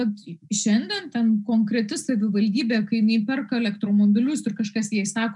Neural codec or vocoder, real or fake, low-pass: none; real; 10.8 kHz